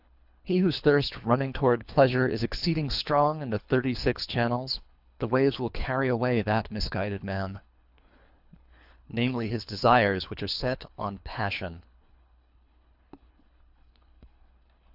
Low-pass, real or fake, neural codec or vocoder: 5.4 kHz; fake; codec, 24 kHz, 3 kbps, HILCodec